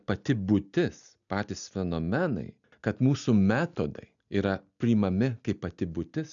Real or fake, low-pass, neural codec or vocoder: real; 7.2 kHz; none